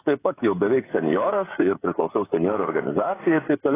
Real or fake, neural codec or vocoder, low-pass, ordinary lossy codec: fake; codec, 16 kHz, 16 kbps, FreqCodec, smaller model; 3.6 kHz; AAC, 16 kbps